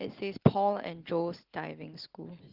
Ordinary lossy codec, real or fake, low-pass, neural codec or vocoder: Opus, 16 kbps; real; 5.4 kHz; none